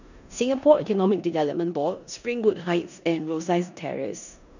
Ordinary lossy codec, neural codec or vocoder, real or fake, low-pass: none; codec, 16 kHz in and 24 kHz out, 0.9 kbps, LongCat-Audio-Codec, four codebook decoder; fake; 7.2 kHz